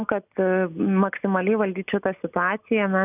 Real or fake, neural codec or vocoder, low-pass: real; none; 3.6 kHz